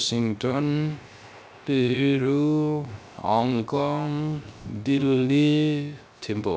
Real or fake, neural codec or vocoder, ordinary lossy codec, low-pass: fake; codec, 16 kHz, 0.3 kbps, FocalCodec; none; none